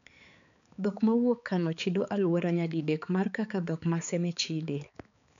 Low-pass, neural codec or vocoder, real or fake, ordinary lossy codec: 7.2 kHz; codec, 16 kHz, 4 kbps, X-Codec, HuBERT features, trained on balanced general audio; fake; none